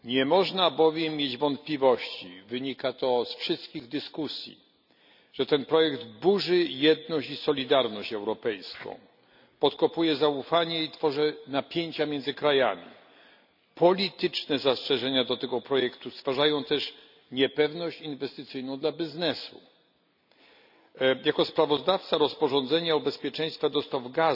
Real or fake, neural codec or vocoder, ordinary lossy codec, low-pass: real; none; none; 5.4 kHz